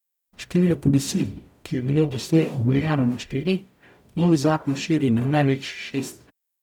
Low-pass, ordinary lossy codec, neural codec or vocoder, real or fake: 19.8 kHz; none; codec, 44.1 kHz, 0.9 kbps, DAC; fake